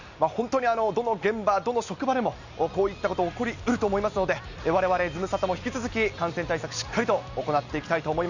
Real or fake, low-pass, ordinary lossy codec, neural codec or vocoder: real; 7.2 kHz; none; none